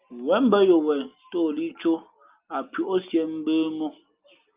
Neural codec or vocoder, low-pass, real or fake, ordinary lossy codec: none; 3.6 kHz; real; Opus, 24 kbps